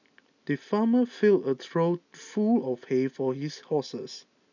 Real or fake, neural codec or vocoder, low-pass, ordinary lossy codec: real; none; 7.2 kHz; none